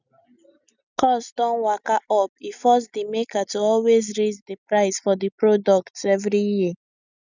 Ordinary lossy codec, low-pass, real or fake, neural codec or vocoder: none; 7.2 kHz; real; none